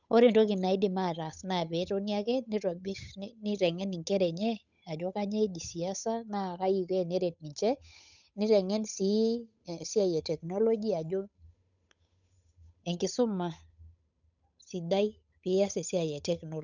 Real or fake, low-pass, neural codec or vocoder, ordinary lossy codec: fake; 7.2 kHz; codec, 16 kHz, 8 kbps, FunCodec, trained on Chinese and English, 25 frames a second; none